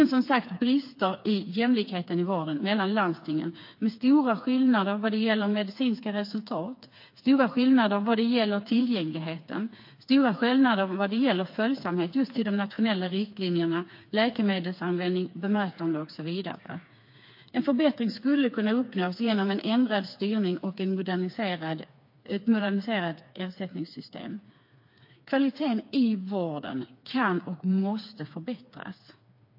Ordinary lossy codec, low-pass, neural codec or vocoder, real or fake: MP3, 32 kbps; 5.4 kHz; codec, 16 kHz, 4 kbps, FreqCodec, smaller model; fake